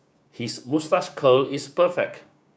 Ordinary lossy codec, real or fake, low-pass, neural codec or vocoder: none; fake; none; codec, 16 kHz, 6 kbps, DAC